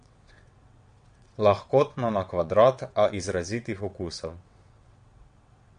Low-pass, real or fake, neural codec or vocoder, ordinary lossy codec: 9.9 kHz; fake; vocoder, 22.05 kHz, 80 mel bands, WaveNeXt; MP3, 48 kbps